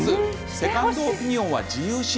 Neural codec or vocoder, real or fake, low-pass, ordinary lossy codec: none; real; none; none